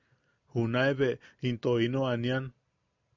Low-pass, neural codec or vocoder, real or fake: 7.2 kHz; none; real